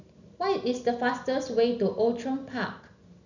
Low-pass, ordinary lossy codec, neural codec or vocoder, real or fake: 7.2 kHz; none; none; real